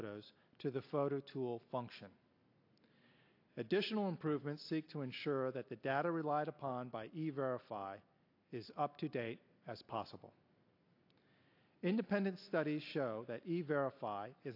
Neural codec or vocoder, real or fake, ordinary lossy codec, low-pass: none; real; AAC, 32 kbps; 5.4 kHz